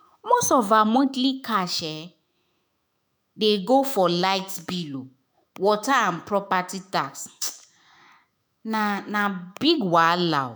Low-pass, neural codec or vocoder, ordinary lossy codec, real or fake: none; autoencoder, 48 kHz, 128 numbers a frame, DAC-VAE, trained on Japanese speech; none; fake